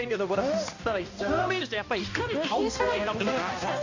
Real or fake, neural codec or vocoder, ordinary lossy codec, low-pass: fake; codec, 16 kHz, 1 kbps, X-Codec, HuBERT features, trained on balanced general audio; AAC, 48 kbps; 7.2 kHz